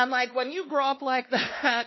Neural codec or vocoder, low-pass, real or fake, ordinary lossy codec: codec, 16 kHz, 2 kbps, X-Codec, WavLM features, trained on Multilingual LibriSpeech; 7.2 kHz; fake; MP3, 24 kbps